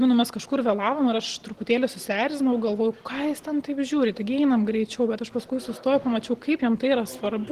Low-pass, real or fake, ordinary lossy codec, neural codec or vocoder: 14.4 kHz; real; Opus, 16 kbps; none